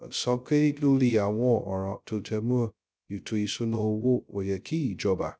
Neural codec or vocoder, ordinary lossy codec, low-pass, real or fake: codec, 16 kHz, 0.2 kbps, FocalCodec; none; none; fake